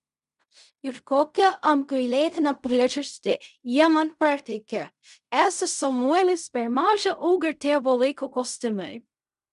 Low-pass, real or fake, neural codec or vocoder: 10.8 kHz; fake; codec, 16 kHz in and 24 kHz out, 0.4 kbps, LongCat-Audio-Codec, fine tuned four codebook decoder